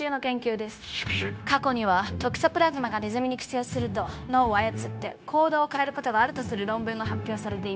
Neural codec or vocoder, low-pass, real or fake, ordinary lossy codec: codec, 16 kHz, 0.9 kbps, LongCat-Audio-Codec; none; fake; none